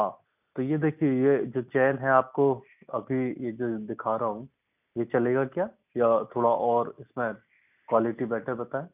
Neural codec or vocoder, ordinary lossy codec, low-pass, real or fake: none; none; 3.6 kHz; real